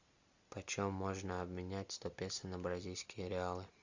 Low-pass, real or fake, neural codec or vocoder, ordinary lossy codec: 7.2 kHz; real; none; Opus, 64 kbps